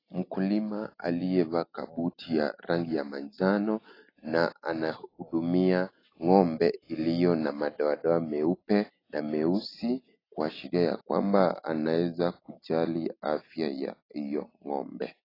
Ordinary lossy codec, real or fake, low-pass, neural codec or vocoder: AAC, 24 kbps; real; 5.4 kHz; none